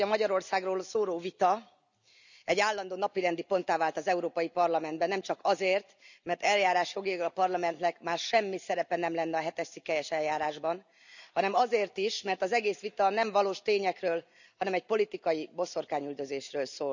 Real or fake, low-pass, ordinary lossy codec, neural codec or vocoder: real; 7.2 kHz; none; none